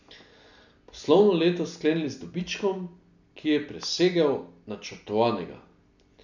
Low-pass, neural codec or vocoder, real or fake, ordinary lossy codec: 7.2 kHz; none; real; none